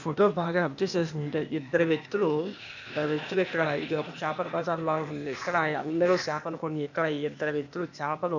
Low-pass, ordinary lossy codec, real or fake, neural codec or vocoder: 7.2 kHz; none; fake; codec, 16 kHz, 0.8 kbps, ZipCodec